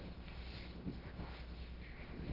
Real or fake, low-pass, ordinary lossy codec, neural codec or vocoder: fake; 5.4 kHz; Opus, 16 kbps; codec, 16 kHz, 0.3 kbps, FocalCodec